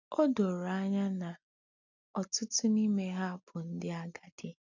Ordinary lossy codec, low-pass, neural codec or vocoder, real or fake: none; 7.2 kHz; none; real